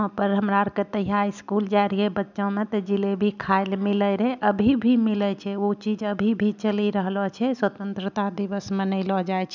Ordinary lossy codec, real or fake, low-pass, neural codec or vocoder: none; real; 7.2 kHz; none